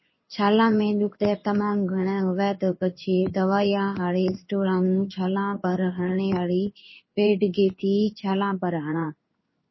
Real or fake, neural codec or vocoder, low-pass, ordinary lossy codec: fake; codec, 16 kHz in and 24 kHz out, 1 kbps, XY-Tokenizer; 7.2 kHz; MP3, 24 kbps